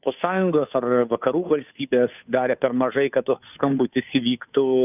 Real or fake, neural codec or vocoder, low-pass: fake; codec, 16 kHz, 2 kbps, FunCodec, trained on Chinese and English, 25 frames a second; 3.6 kHz